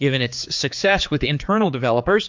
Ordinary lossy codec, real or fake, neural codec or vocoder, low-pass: MP3, 64 kbps; fake; codec, 16 kHz, 4 kbps, X-Codec, HuBERT features, trained on general audio; 7.2 kHz